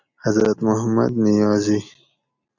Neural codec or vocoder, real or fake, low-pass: none; real; 7.2 kHz